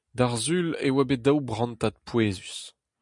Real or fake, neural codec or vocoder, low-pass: real; none; 10.8 kHz